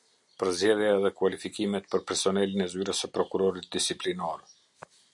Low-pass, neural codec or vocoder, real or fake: 10.8 kHz; none; real